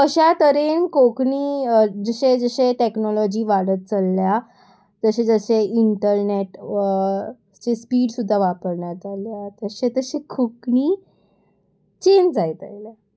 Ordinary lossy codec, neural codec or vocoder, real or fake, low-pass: none; none; real; none